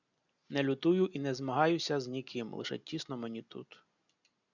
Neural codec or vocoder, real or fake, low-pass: none; real; 7.2 kHz